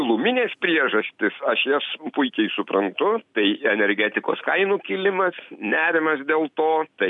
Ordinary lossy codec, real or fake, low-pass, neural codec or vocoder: MP3, 96 kbps; real; 10.8 kHz; none